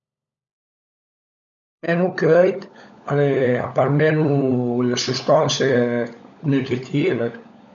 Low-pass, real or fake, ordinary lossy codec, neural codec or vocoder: 7.2 kHz; fake; none; codec, 16 kHz, 16 kbps, FunCodec, trained on LibriTTS, 50 frames a second